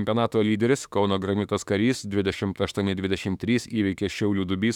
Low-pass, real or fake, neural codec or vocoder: 19.8 kHz; fake; autoencoder, 48 kHz, 32 numbers a frame, DAC-VAE, trained on Japanese speech